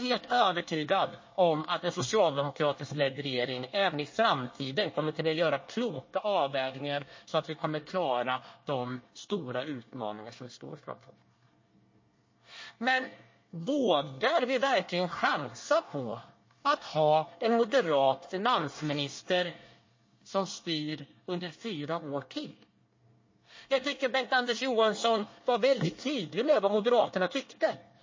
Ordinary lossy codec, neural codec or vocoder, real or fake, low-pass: MP3, 32 kbps; codec, 24 kHz, 1 kbps, SNAC; fake; 7.2 kHz